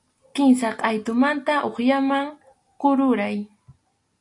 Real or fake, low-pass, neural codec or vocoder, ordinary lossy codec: real; 10.8 kHz; none; AAC, 64 kbps